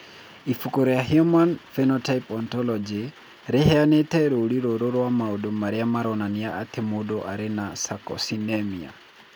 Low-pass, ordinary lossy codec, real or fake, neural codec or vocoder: none; none; real; none